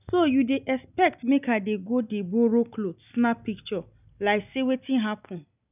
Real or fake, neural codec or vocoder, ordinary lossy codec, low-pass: real; none; none; 3.6 kHz